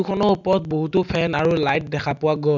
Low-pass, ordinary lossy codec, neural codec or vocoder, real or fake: 7.2 kHz; none; none; real